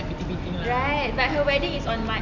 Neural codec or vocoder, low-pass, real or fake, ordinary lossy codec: none; 7.2 kHz; real; none